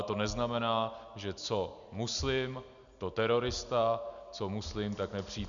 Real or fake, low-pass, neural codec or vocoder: real; 7.2 kHz; none